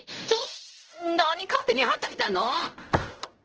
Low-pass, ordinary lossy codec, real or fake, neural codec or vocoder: 7.2 kHz; Opus, 16 kbps; fake; codec, 16 kHz in and 24 kHz out, 0.4 kbps, LongCat-Audio-Codec, fine tuned four codebook decoder